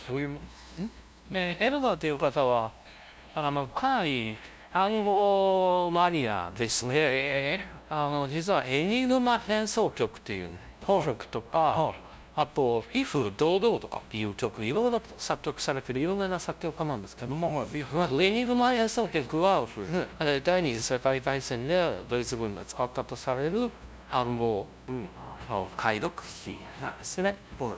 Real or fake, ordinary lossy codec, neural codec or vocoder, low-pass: fake; none; codec, 16 kHz, 0.5 kbps, FunCodec, trained on LibriTTS, 25 frames a second; none